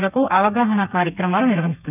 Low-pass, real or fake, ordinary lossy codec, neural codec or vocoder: 3.6 kHz; fake; none; codec, 32 kHz, 1.9 kbps, SNAC